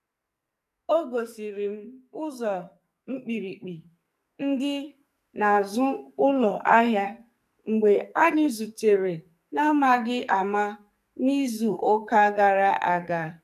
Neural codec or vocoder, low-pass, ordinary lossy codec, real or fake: codec, 44.1 kHz, 2.6 kbps, SNAC; 14.4 kHz; none; fake